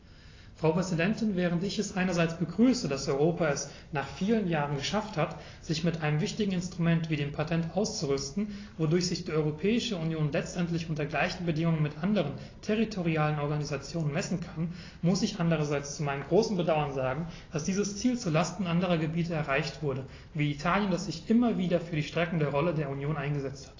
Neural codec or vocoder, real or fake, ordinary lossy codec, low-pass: none; real; AAC, 32 kbps; 7.2 kHz